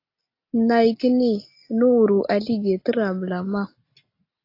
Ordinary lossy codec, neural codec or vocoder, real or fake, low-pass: AAC, 32 kbps; none; real; 5.4 kHz